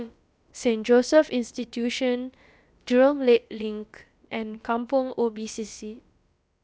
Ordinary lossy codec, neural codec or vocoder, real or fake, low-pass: none; codec, 16 kHz, about 1 kbps, DyCAST, with the encoder's durations; fake; none